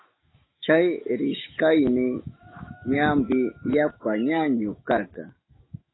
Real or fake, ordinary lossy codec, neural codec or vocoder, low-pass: fake; AAC, 16 kbps; autoencoder, 48 kHz, 128 numbers a frame, DAC-VAE, trained on Japanese speech; 7.2 kHz